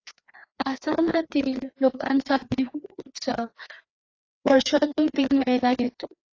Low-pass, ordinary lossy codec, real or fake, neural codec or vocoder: 7.2 kHz; AAC, 32 kbps; fake; codec, 16 kHz, 2 kbps, FreqCodec, larger model